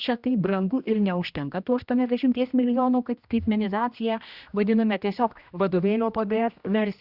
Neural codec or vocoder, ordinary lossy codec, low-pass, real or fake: codec, 16 kHz, 1 kbps, X-Codec, HuBERT features, trained on general audio; Opus, 64 kbps; 5.4 kHz; fake